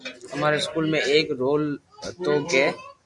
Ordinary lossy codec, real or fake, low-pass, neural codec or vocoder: AAC, 64 kbps; real; 10.8 kHz; none